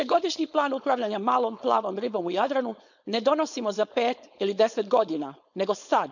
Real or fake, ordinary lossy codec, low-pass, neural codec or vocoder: fake; none; 7.2 kHz; codec, 16 kHz, 4.8 kbps, FACodec